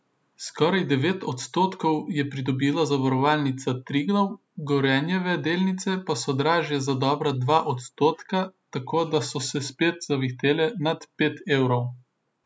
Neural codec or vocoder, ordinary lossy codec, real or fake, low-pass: none; none; real; none